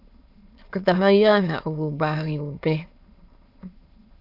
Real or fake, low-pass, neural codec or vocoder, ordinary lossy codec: fake; 5.4 kHz; autoencoder, 22.05 kHz, a latent of 192 numbers a frame, VITS, trained on many speakers; AAC, 32 kbps